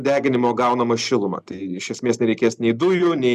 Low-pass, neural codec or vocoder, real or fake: 14.4 kHz; none; real